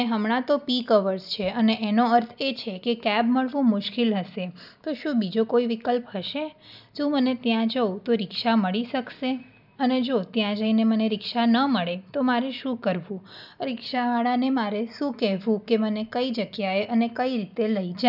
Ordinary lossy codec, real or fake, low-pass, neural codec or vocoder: none; real; 5.4 kHz; none